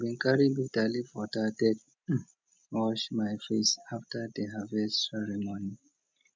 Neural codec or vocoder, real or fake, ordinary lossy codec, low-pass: none; real; none; none